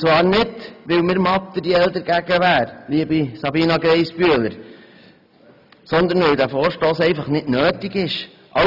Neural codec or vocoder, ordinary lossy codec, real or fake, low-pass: none; none; real; 5.4 kHz